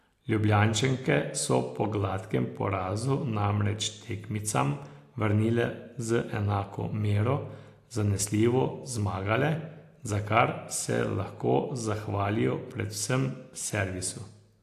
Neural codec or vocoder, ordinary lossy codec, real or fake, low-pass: none; AAC, 64 kbps; real; 14.4 kHz